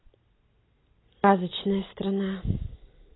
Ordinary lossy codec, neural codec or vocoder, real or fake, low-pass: AAC, 16 kbps; none; real; 7.2 kHz